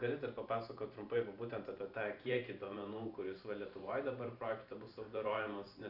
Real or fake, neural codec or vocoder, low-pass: fake; vocoder, 44.1 kHz, 128 mel bands every 512 samples, BigVGAN v2; 5.4 kHz